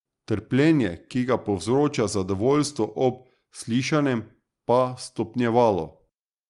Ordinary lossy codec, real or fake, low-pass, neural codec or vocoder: Opus, 32 kbps; real; 10.8 kHz; none